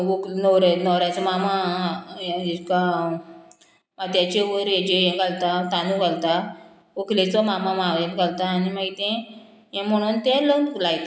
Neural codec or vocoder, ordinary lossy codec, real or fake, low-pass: none; none; real; none